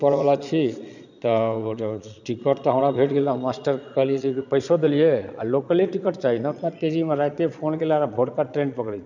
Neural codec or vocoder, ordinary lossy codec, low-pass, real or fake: vocoder, 22.05 kHz, 80 mel bands, Vocos; none; 7.2 kHz; fake